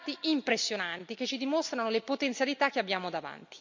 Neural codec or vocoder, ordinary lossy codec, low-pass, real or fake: none; MP3, 64 kbps; 7.2 kHz; real